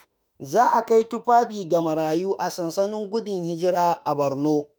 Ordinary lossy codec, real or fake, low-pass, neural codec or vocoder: none; fake; none; autoencoder, 48 kHz, 32 numbers a frame, DAC-VAE, trained on Japanese speech